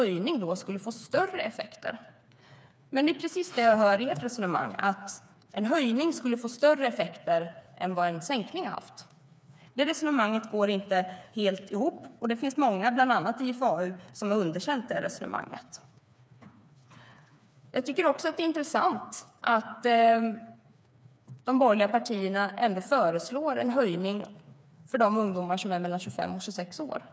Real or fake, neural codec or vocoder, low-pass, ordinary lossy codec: fake; codec, 16 kHz, 4 kbps, FreqCodec, smaller model; none; none